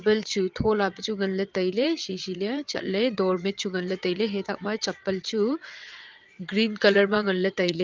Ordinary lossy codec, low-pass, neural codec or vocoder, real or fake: Opus, 32 kbps; 7.2 kHz; vocoder, 22.05 kHz, 80 mel bands, WaveNeXt; fake